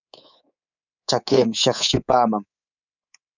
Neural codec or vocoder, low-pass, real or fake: codec, 24 kHz, 3.1 kbps, DualCodec; 7.2 kHz; fake